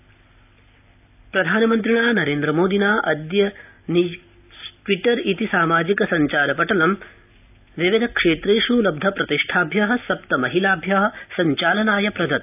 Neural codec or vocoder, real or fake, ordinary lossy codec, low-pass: none; real; AAC, 32 kbps; 3.6 kHz